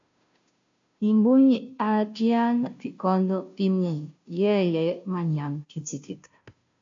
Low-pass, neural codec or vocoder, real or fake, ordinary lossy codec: 7.2 kHz; codec, 16 kHz, 0.5 kbps, FunCodec, trained on Chinese and English, 25 frames a second; fake; AAC, 64 kbps